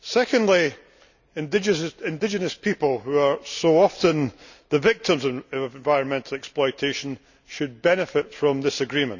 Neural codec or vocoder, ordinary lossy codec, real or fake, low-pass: none; none; real; 7.2 kHz